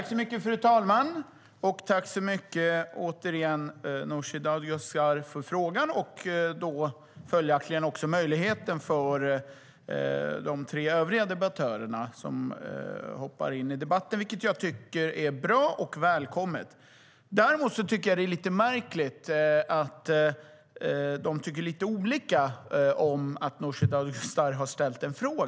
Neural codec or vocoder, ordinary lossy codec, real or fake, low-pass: none; none; real; none